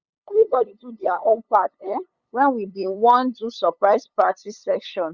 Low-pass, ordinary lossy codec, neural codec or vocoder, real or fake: 7.2 kHz; Opus, 64 kbps; codec, 16 kHz, 8 kbps, FunCodec, trained on LibriTTS, 25 frames a second; fake